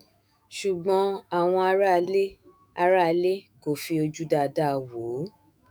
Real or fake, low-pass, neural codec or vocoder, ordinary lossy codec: fake; none; autoencoder, 48 kHz, 128 numbers a frame, DAC-VAE, trained on Japanese speech; none